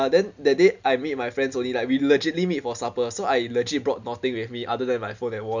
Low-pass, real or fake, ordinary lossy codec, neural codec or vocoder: 7.2 kHz; real; none; none